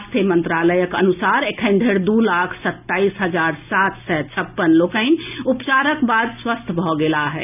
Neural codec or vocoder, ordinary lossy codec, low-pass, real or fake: none; none; 3.6 kHz; real